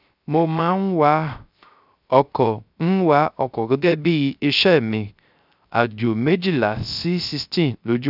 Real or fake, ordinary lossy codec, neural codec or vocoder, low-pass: fake; none; codec, 16 kHz, 0.3 kbps, FocalCodec; 5.4 kHz